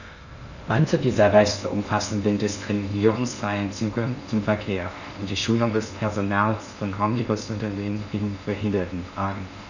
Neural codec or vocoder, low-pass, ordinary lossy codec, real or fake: codec, 16 kHz in and 24 kHz out, 0.6 kbps, FocalCodec, streaming, 2048 codes; 7.2 kHz; none; fake